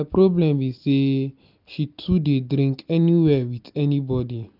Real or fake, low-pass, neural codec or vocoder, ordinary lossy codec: real; 5.4 kHz; none; none